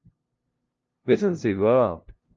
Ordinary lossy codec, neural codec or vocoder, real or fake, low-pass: Opus, 24 kbps; codec, 16 kHz, 0.5 kbps, FunCodec, trained on LibriTTS, 25 frames a second; fake; 7.2 kHz